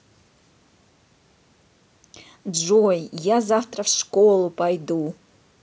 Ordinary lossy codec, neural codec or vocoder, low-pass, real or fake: none; none; none; real